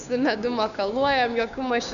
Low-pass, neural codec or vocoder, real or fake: 7.2 kHz; codec, 16 kHz, 6 kbps, DAC; fake